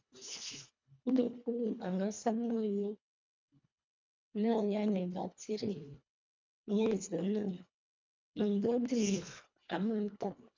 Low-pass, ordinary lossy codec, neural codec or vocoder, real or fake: 7.2 kHz; MP3, 64 kbps; codec, 24 kHz, 1.5 kbps, HILCodec; fake